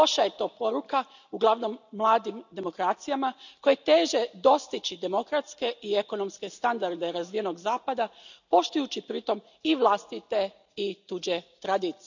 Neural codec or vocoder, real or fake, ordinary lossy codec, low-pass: none; real; none; 7.2 kHz